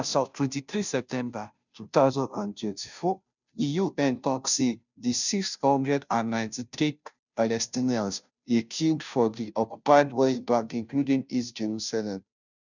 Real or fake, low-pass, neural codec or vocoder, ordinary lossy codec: fake; 7.2 kHz; codec, 16 kHz, 0.5 kbps, FunCodec, trained on Chinese and English, 25 frames a second; none